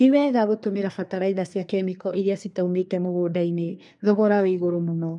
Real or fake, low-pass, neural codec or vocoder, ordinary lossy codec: fake; 10.8 kHz; codec, 24 kHz, 1 kbps, SNAC; none